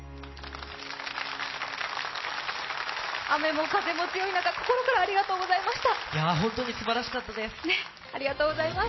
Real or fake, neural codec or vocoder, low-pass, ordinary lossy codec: real; none; 7.2 kHz; MP3, 24 kbps